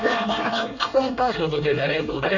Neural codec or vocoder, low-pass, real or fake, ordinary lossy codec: codec, 24 kHz, 1 kbps, SNAC; 7.2 kHz; fake; none